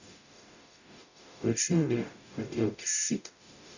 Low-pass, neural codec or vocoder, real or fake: 7.2 kHz; codec, 44.1 kHz, 0.9 kbps, DAC; fake